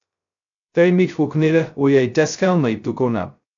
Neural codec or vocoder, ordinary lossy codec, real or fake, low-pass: codec, 16 kHz, 0.2 kbps, FocalCodec; AAC, 64 kbps; fake; 7.2 kHz